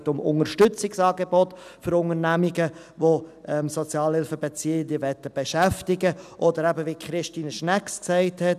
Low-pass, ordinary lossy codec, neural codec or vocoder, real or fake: 14.4 kHz; none; none; real